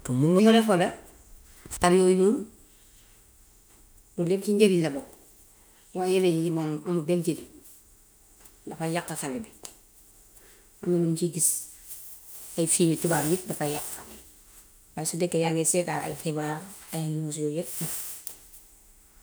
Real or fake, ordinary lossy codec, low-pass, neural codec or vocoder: fake; none; none; autoencoder, 48 kHz, 32 numbers a frame, DAC-VAE, trained on Japanese speech